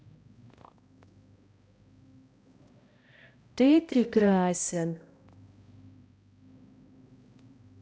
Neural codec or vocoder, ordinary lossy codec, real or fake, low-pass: codec, 16 kHz, 0.5 kbps, X-Codec, HuBERT features, trained on balanced general audio; none; fake; none